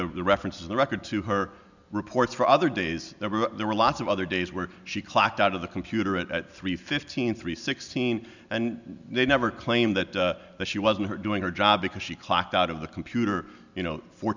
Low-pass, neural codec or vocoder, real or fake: 7.2 kHz; none; real